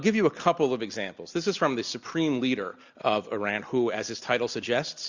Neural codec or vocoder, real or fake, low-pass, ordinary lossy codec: none; real; 7.2 kHz; Opus, 64 kbps